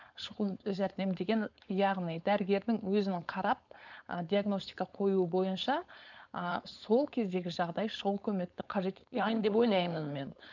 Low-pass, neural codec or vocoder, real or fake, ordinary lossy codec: 7.2 kHz; codec, 16 kHz, 4.8 kbps, FACodec; fake; none